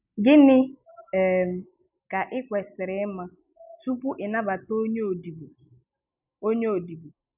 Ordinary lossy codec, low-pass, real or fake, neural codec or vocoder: none; 3.6 kHz; real; none